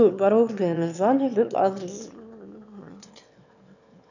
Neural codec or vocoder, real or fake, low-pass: autoencoder, 22.05 kHz, a latent of 192 numbers a frame, VITS, trained on one speaker; fake; 7.2 kHz